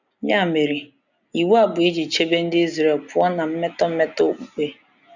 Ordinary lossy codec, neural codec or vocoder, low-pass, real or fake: none; none; 7.2 kHz; real